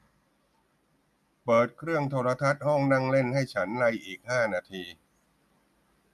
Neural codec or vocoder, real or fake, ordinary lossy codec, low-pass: none; real; none; 14.4 kHz